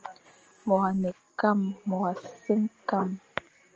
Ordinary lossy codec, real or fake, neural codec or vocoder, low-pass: Opus, 24 kbps; real; none; 7.2 kHz